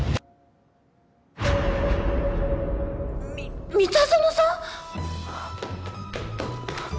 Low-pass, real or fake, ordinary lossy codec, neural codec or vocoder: none; real; none; none